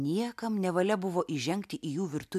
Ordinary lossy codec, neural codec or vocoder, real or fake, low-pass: MP3, 96 kbps; none; real; 14.4 kHz